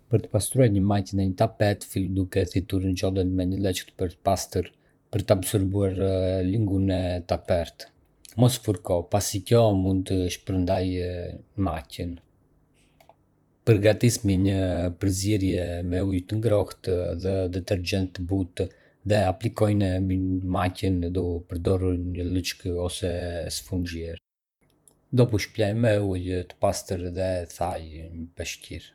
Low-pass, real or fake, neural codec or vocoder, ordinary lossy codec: 19.8 kHz; fake; vocoder, 44.1 kHz, 128 mel bands, Pupu-Vocoder; none